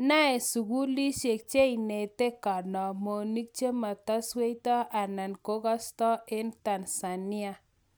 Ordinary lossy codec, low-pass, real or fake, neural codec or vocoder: none; none; real; none